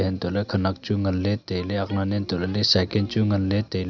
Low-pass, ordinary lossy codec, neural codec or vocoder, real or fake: 7.2 kHz; none; none; real